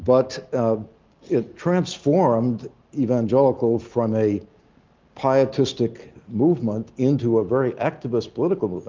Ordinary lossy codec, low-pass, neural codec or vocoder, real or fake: Opus, 24 kbps; 7.2 kHz; none; real